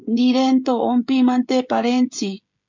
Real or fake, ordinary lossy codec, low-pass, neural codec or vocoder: fake; MP3, 64 kbps; 7.2 kHz; codec, 16 kHz, 16 kbps, FreqCodec, smaller model